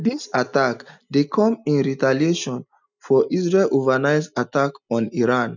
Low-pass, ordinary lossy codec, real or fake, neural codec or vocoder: 7.2 kHz; none; real; none